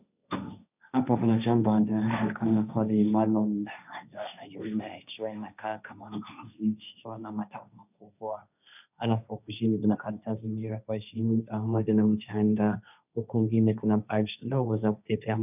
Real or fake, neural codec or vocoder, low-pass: fake; codec, 16 kHz, 1.1 kbps, Voila-Tokenizer; 3.6 kHz